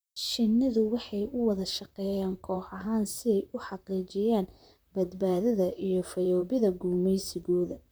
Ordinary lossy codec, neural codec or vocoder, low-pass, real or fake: none; vocoder, 44.1 kHz, 128 mel bands, Pupu-Vocoder; none; fake